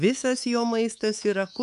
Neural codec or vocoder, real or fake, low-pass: codec, 24 kHz, 3.1 kbps, DualCodec; fake; 10.8 kHz